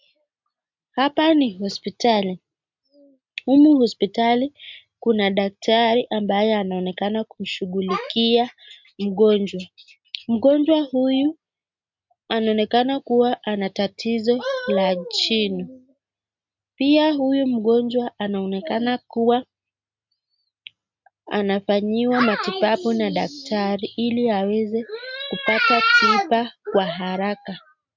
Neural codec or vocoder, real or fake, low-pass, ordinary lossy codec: none; real; 7.2 kHz; MP3, 64 kbps